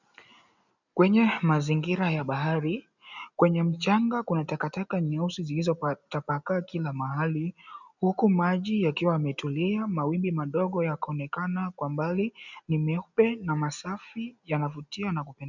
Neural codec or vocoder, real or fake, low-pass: none; real; 7.2 kHz